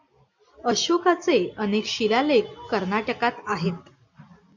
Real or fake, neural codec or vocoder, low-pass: real; none; 7.2 kHz